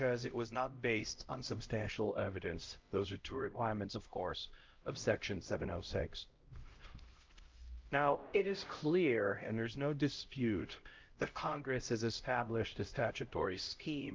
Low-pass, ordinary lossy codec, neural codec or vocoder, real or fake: 7.2 kHz; Opus, 24 kbps; codec, 16 kHz, 0.5 kbps, X-Codec, HuBERT features, trained on LibriSpeech; fake